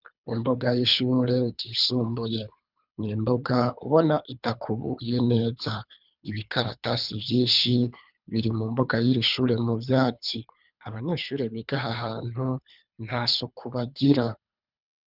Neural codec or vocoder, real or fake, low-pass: codec, 24 kHz, 3 kbps, HILCodec; fake; 5.4 kHz